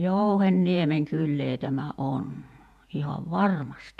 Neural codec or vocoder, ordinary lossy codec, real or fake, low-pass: vocoder, 48 kHz, 128 mel bands, Vocos; MP3, 96 kbps; fake; 14.4 kHz